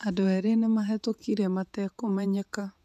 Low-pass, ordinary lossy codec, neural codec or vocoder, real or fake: 14.4 kHz; none; vocoder, 48 kHz, 128 mel bands, Vocos; fake